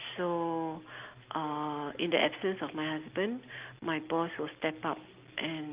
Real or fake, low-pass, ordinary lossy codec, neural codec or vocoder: real; 3.6 kHz; Opus, 32 kbps; none